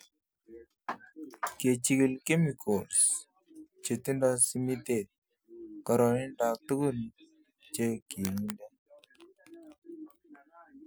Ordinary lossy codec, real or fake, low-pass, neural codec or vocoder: none; real; none; none